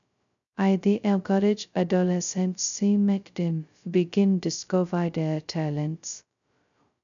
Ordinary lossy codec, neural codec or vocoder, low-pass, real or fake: none; codec, 16 kHz, 0.2 kbps, FocalCodec; 7.2 kHz; fake